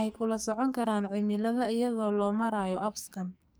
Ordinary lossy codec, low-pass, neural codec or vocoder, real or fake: none; none; codec, 44.1 kHz, 2.6 kbps, SNAC; fake